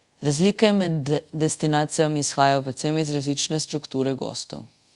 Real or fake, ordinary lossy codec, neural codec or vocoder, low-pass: fake; Opus, 64 kbps; codec, 24 kHz, 0.5 kbps, DualCodec; 10.8 kHz